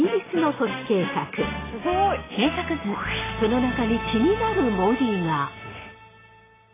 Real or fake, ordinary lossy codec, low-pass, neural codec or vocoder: real; AAC, 16 kbps; 3.6 kHz; none